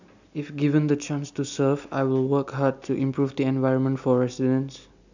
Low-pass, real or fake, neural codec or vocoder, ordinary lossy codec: 7.2 kHz; real; none; none